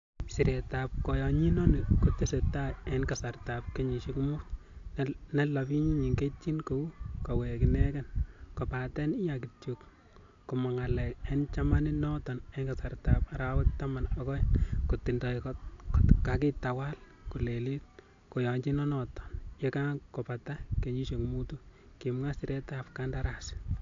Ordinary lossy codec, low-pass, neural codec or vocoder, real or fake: AAC, 48 kbps; 7.2 kHz; none; real